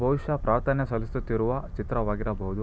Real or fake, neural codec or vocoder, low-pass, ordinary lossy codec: real; none; none; none